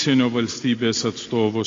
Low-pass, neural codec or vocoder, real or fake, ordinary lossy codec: 7.2 kHz; none; real; AAC, 32 kbps